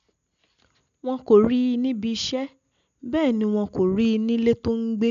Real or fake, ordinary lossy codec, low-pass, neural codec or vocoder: real; none; 7.2 kHz; none